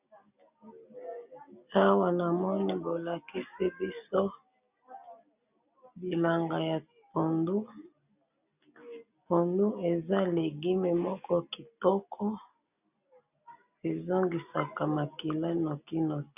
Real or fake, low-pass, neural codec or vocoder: real; 3.6 kHz; none